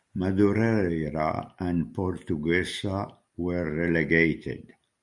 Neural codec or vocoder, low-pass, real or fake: none; 10.8 kHz; real